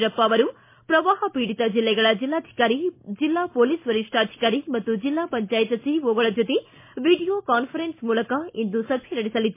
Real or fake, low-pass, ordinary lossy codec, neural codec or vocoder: real; 3.6 kHz; MP3, 24 kbps; none